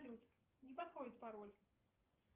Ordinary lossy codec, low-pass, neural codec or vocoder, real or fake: Opus, 32 kbps; 3.6 kHz; vocoder, 24 kHz, 100 mel bands, Vocos; fake